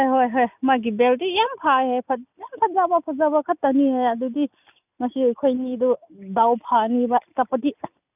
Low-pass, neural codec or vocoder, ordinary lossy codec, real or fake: 3.6 kHz; none; none; real